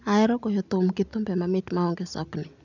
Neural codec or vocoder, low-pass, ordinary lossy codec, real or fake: none; 7.2 kHz; none; real